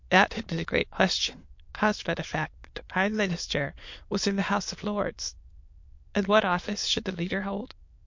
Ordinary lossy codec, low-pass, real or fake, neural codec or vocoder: MP3, 48 kbps; 7.2 kHz; fake; autoencoder, 22.05 kHz, a latent of 192 numbers a frame, VITS, trained on many speakers